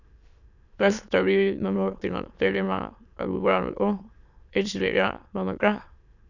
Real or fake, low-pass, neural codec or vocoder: fake; 7.2 kHz; autoencoder, 22.05 kHz, a latent of 192 numbers a frame, VITS, trained on many speakers